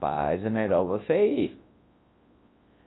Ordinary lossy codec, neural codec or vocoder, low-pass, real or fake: AAC, 16 kbps; codec, 24 kHz, 0.9 kbps, WavTokenizer, large speech release; 7.2 kHz; fake